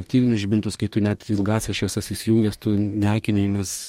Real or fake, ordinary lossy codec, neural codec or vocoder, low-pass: fake; MP3, 64 kbps; codec, 44.1 kHz, 2.6 kbps, DAC; 19.8 kHz